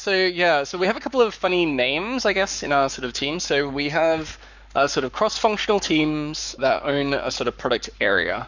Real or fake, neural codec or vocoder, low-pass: fake; codec, 44.1 kHz, 7.8 kbps, Pupu-Codec; 7.2 kHz